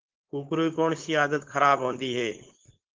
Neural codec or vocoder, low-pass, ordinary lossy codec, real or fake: codec, 16 kHz, 4.8 kbps, FACodec; 7.2 kHz; Opus, 16 kbps; fake